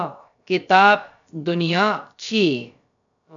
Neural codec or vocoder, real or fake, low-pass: codec, 16 kHz, about 1 kbps, DyCAST, with the encoder's durations; fake; 7.2 kHz